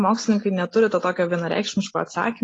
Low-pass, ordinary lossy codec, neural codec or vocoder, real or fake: 9.9 kHz; AAC, 32 kbps; none; real